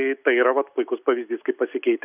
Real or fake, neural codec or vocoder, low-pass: real; none; 3.6 kHz